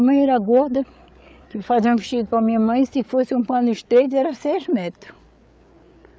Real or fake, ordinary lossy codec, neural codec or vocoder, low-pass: fake; none; codec, 16 kHz, 8 kbps, FreqCodec, larger model; none